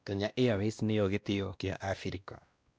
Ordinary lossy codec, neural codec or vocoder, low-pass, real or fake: none; codec, 16 kHz, 1 kbps, X-Codec, WavLM features, trained on Multilingual LibriSpeech; none; fake